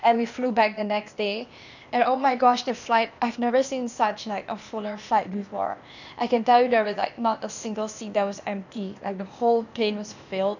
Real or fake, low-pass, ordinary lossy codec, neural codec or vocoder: fake; 7.2 kHz; none; codec, 16 kHz, 0.8 kbps, ZipCodec